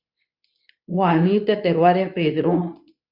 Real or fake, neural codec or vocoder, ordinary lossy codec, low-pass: fake; codec, 24 kHz, 0.9 kbps, WavTokenizer, medium speech release version 2; AAC, 48 kbps; 5.4 kHz